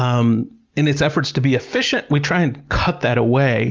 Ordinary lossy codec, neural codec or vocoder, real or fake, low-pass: Opus, 32 kbps; none; real; 7.2 kHz